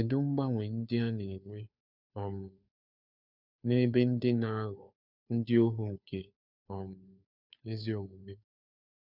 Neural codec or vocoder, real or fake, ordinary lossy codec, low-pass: codec, 16 kHz, 2 kbps, FunCodec, trained on Chinese and English, 25 frames a second; fake; none; 5.4 kHz